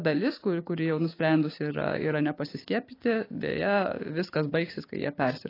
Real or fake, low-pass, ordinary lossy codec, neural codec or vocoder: fake; 5.4 kHz; AAC, 24 kbps; codec, 16 kHz, 6 kbps, DAC